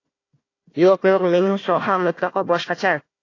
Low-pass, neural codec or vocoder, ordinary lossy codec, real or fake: 7.2 kHz; codec, 16 kHz, 1 kbps, FunCodec, trained on Chinese and English, 50 frames a second; AAC, 32 kbps; fake